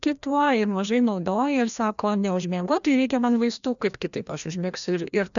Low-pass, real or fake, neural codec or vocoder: 7.2 kHz; fake; codec, 16 kHz, 1 kbps, FreqCodec, larger model